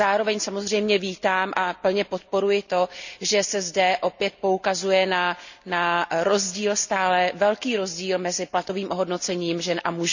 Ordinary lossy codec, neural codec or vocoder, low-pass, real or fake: none; none; 7.2 kHz; real